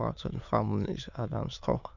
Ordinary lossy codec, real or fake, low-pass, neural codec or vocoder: none; fake; 7.2 kHz; autoencoder, 22.05 kHz, a latent of 192 numbers a frame, VITS, trained on many speakers